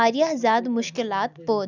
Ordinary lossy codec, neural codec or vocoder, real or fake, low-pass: none; none; real; 7.2 kHz